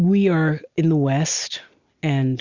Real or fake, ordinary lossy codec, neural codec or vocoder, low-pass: real; Opus, 64 kbps; none; 7.2 kHz